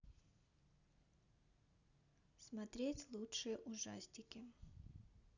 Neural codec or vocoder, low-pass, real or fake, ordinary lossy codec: none; 7.2 kHz; real; none